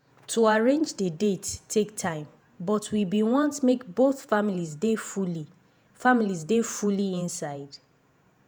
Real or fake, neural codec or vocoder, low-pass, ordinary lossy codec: fake; vocoder, 48 kHz, 128 mel bands, Vocos; none; none